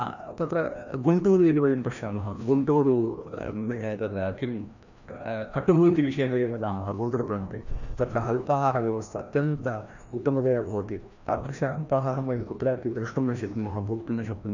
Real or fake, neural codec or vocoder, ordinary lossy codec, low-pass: fake; codec, 16 kHz, 1 kbps, FreqCodec, larger model; none; 7.2 kHz